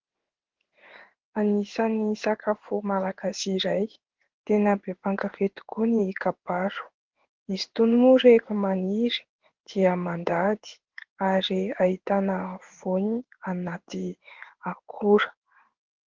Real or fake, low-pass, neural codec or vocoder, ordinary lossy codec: fake; 7.2 kHz; codec, 16 kHz in and 24 kHz out, 1 kbps, XY-Tokenizer; Opus, 16 kbps